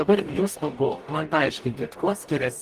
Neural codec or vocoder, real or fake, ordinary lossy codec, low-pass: codec, 44.1 kHz, 0.9 kbps, DAC; fake; Opus, 24 kbps; 14.4 kHz